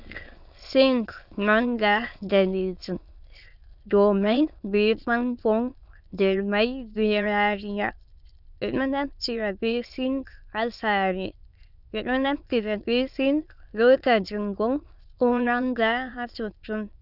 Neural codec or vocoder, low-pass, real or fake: autoencoder, 22.05 kHz, a latent of 192 numbers a frame, VITS, trained on many speakers; 5.4 kHz; fake